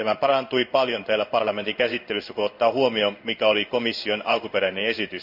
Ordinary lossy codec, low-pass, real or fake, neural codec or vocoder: none; 5.4 kHz; fake; codec, 16 kHz in and 24 kHz out, 1 kbps, XY-Tokenizer